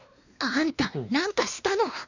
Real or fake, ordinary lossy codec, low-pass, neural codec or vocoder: fake; none; 7.2 kHz; codec, 16 kHz, 2 kbps, X-Codec, WavLM features, trained on Multilingual LibriSpeech